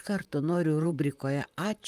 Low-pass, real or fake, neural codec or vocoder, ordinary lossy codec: 14.4 kHz; fake; vocoder, 44.1 kHz, 128 mel bands, Pupu-Vocoder; Opus, 32 kbps